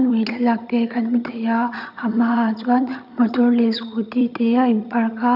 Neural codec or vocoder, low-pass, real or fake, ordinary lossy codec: vocoder, 22.05 kHz, 80 mel bands, HiFi-GAN; 5.4 kHz; fake; none